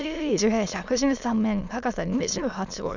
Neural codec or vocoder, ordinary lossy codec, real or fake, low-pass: autoencoder, 22.05 kHz, a latent of 192 numbers a frame, VITS, trained on many speakers; none; fake; 7.2 kHz